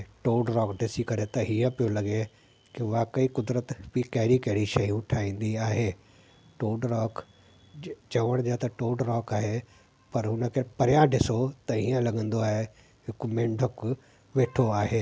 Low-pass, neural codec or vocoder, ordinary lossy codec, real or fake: none; none; none; real